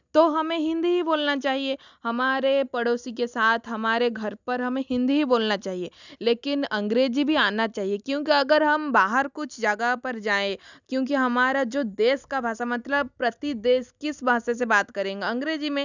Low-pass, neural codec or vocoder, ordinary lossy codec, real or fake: 7.2 kHz; none; none; real